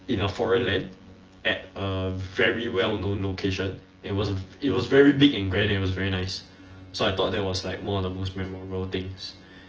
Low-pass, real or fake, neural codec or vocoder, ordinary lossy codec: 7.2 kHz; fake; vocoder, 24 kHz, 100 mel bands, Vocos; Opus, 16 kbps